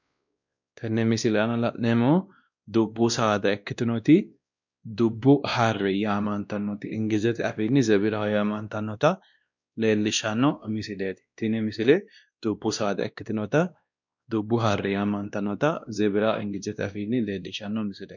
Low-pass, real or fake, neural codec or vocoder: 7.2 kHz; fake; codec, 16 kHz, 1 kbps, X-Codec, WavLM features, trained on Multilingual LibriSpeech